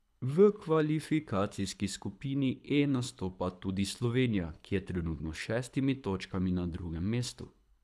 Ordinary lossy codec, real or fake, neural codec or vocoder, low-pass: none; fake; codec, 24 kHz, 6 kbps, HILCodec; none